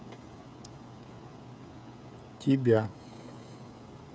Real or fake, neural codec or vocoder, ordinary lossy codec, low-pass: fake; codec, 16 kHz, 16 kbps, FreqCodec, smaller model; none; none